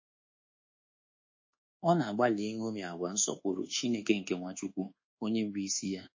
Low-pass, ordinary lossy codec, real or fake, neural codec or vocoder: 7.2 kHz; MP3, 32 kbps; fake; codec, 24 kHz, 1.2 kbps, DualCodec